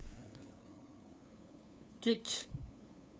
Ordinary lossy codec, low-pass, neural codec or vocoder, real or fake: none; none; codec, 16 kHz, 2 kbps, FunCodec, trained on LibriTTS, 25 frames a second; fake